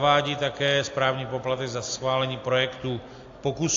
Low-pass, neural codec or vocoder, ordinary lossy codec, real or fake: 7.2 kHz; none; AAC, 48 kbps; real